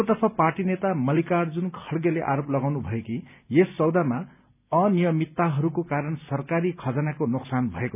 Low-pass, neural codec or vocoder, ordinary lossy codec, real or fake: 3.6 kHz; none; none; real